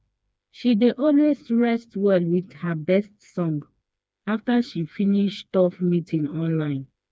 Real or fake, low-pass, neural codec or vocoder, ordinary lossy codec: fake; none; codec, 16 kHz, 2 kbps, FreqCodec, smaller model; none